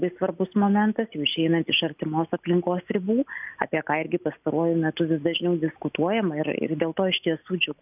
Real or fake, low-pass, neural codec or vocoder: real; 3.6 kHz; none